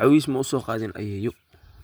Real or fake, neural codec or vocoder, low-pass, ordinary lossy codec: real; none; none; none